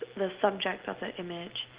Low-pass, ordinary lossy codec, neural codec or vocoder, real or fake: 3.6 kHz; Opus, 16 kbps; none; real